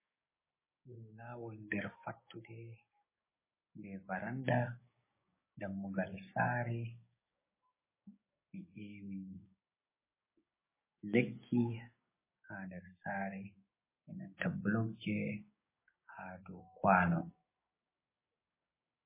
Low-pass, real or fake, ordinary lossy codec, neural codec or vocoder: 3.6 kHz; fake; MP3, 16 kbps; codec, 16 kHz, 6 kbps, DAC